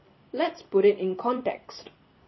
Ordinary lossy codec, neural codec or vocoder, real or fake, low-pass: MP3, 24 kbps; vocoder, 44.1 kHz, 128 mel bands every 256 samples, BigVGAN v2; fake; 7.2 kHz